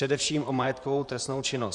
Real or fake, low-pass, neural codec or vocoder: fake; 10.8 kHz; vocoder, 44.1 kHz, 128 mel bands, Pupu-Vocoder